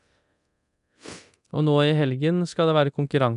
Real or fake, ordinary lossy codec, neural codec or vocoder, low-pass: fake; none; codec, 24 kHz, 0.9 kbps, DualCodec; 10.8 kHz